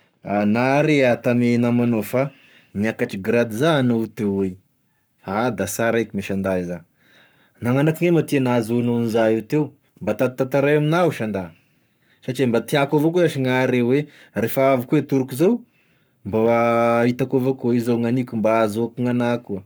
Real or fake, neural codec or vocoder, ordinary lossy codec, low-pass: fake; codec, 44.1 kHz, 7.8 kbps, Pupu-Codec; none; none